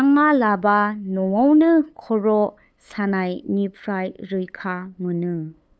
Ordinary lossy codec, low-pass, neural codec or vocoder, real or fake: none; none; codec, 16 kHz, 8 kbps, FunCodec, trained on LibriTTS, 25 frames a second; fake